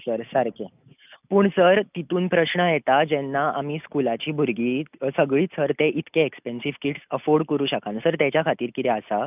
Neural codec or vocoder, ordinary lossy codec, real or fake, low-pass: none; none; real; 3.6 kHz